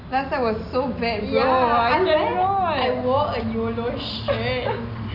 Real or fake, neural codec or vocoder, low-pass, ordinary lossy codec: real; none; 5.4 kHz; none